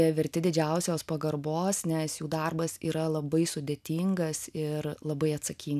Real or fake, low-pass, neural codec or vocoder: real; 14.4 kHz; none